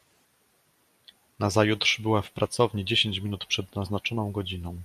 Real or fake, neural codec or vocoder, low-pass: real; none; 14.4 kHz